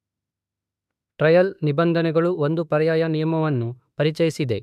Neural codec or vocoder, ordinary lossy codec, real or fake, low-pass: autoencoder, 48 kHz, 32 numbers a frame, DAC-VAE, trained on Japanese speech; none; fake; 14.4 kHz